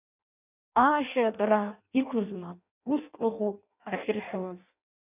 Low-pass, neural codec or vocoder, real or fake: 3.6 kHz; codec, 16 kHz in and 24 kHz out, 0.6 kbps, FireRedTTS-2 codec; fake